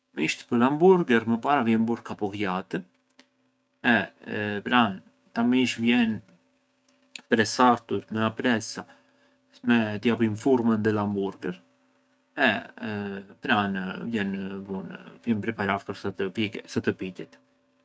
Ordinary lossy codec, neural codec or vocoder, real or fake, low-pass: none; codec, 16 kHz, 6 kbps, DAC; fake; none